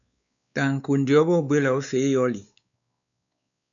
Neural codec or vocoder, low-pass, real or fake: codec, 16 kHz, 4 kbps, X-Codec, WavLM features, trained on Multilingual LibriSpeech; 7.2 kHz; fake